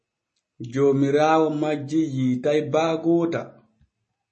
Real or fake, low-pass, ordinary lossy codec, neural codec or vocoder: real; 10.8 kHz; MP3, 32 kbps; none